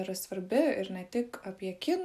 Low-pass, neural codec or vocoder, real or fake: 14.4 kHz; none; real